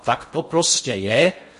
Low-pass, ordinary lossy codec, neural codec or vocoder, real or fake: 10.8 kHz; MP3, 48 kbps; codec, 16 kHz in and 24 kHz out, 0.6 kbps, FocalCodec, streaming, 2048 codes; fake